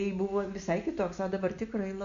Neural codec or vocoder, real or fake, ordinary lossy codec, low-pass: none; real; AAC, 64 kbps; 7.2 kHz